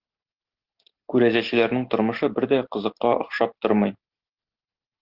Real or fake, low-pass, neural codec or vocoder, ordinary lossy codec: real; 5.4 kHz; none; Opus, 16 kbps